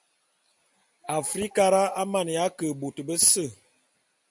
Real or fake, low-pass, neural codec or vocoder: real; 10.8 kHz; none